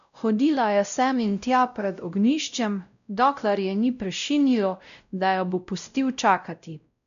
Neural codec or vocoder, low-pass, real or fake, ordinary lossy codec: codec, 16 kHz, 0.5 kbps, X-Codec, WavLM features, trained on Multilingual LibriSpeech; 7.2 kHz; fake; none